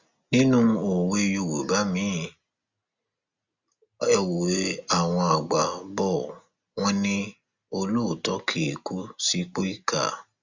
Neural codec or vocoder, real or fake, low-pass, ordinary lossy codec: none; real; 7.2 kHz; Opus, 64 kbps